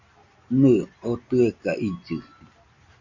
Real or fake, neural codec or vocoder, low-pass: real; none; 7.2 kHz